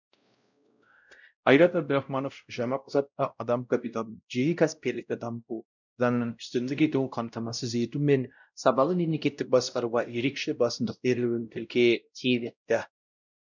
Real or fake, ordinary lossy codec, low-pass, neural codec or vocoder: fake; none; 7.2 kHz; codec, 16 kHz, 0.5 kbps, X-Codec, WavLM features, trained on Multilingual LibriSpeech